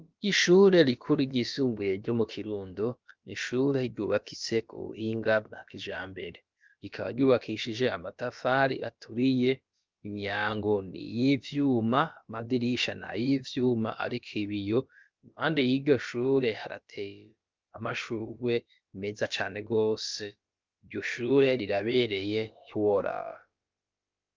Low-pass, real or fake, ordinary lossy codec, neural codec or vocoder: 7.2 kHz; fake; Opus, 24 kbps; codec, 16 kHz, about 1 kbps, DyCAST, with the encoder's durations